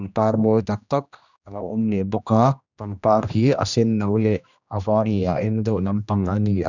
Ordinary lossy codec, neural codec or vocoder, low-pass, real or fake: none; codec, 16 kHz, 1 kbps, X-Codec, HuBERT features, trained on general audio; 7.2 kHz; fake